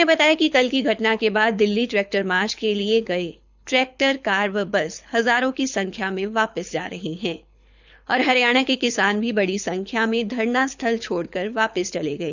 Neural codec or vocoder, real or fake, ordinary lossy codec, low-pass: codec, 24 kHz, 6 kbps, HILCodec; fake; none; 7.2 kHz